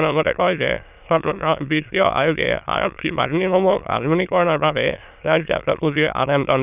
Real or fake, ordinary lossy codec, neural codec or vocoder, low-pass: fake; none; autoencoder, 22.05 kHz, a latent of 192 numbers a frame, VITS, trained on many speakers; 3.6 kHz